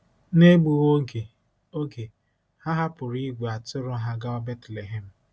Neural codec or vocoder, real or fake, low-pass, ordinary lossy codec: none; real; none; none